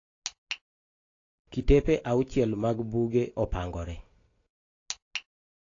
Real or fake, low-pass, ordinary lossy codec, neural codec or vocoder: real; 7.2 kHz; AAC, 32 kbps; none